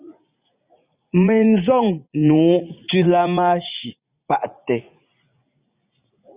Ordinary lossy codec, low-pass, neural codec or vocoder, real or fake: Opus, 64 kbps; 3.6 kHz; vocoder, 44.1 kHz, 80 mel bands, Vocos; fake